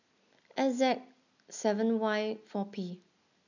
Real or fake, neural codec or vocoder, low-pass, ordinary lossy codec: real; none; 7.2 kHz; none